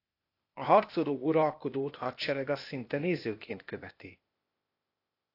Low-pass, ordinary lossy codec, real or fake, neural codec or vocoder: 5.4 kHz; AAC, 32 kbps; fake; codec, 16 kHz, 0.8 kbps, ZipCodec